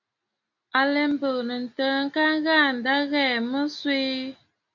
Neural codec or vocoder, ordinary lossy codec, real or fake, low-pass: none; MP3, 64 kbps; real; 7.2 kHz